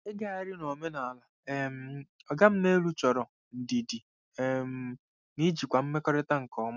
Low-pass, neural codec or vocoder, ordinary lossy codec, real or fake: 7.2 kHz; none; none; real